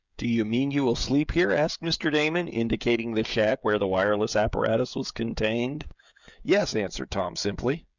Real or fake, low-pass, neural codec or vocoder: fake; 7.2 kHz; codec, 16 kHz, 16 kbps, FreqCodec, smaller model